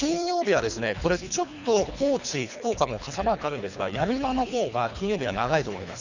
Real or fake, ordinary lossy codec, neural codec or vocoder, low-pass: fake; none; codec, 24 kHz, 3 kbps, HILCodec; 7.2 kHz